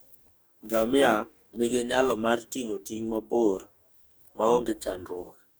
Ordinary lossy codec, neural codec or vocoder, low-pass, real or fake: none; codec, 44.1 kHz, 2.6 kbps, DAC; none; fake